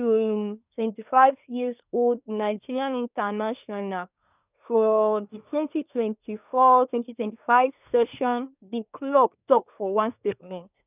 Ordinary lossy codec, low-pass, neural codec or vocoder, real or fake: none; 3.6 kHz; codec, 24 kHz, 1 kbps, SNAC; fake